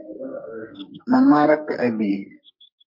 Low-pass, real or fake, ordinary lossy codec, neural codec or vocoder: 5.4 kHz; fake; MP3, 32 kbps; codec, 44.1 kHz, 2.6 kbps, SNAC